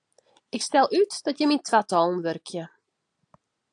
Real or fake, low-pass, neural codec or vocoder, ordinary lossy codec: real; 9.9 kHz; none; AAC, 64 kbps